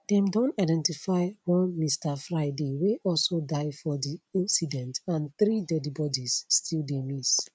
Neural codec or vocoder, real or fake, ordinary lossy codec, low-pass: none; real; none; none